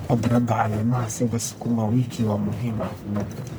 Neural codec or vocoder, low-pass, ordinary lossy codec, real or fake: codec, 44.1 kHz, 1.7 kbps, Pupu-Codec; none; none; fake